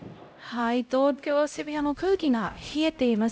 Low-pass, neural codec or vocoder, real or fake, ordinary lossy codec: none; codec, 16 kHz, 0.5 kbps, X-Codec, HuBERT features, trained on LibriSpeech; fake; none